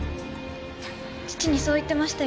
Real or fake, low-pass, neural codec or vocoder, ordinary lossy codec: real; none; none; none